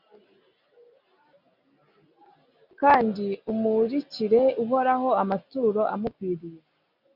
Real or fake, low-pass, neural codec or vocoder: real; 5.4 kHz; none